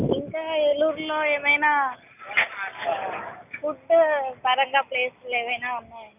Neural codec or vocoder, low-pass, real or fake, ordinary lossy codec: none; 3.6 kHz; real; none